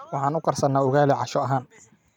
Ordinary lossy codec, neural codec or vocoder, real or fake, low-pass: none; vocoder, 44.1 kHz, 128 mel bands every 256 samples, BigVGAN v2; fake; 19.8 kHz